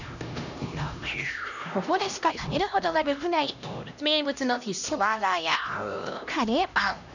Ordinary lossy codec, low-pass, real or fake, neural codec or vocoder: none; 7.2 kHz; fake; codec, 16 kHz, 1 kbps, X-Codec, HuBERT features, trained on LibriSpeech